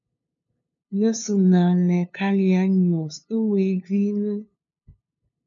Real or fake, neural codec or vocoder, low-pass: fake; codec, 16 kHz, 2 kbps, FunCodec, trained on LibriTTS, 25 frames a second; 7.2 kHz